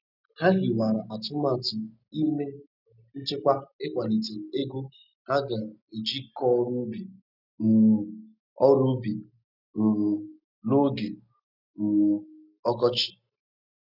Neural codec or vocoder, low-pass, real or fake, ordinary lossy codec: none; 5.4 kHz; real; none